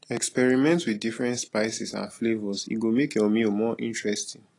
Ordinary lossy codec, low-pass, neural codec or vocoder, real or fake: AAC, 32 kbps; 10.8 kHz; none; real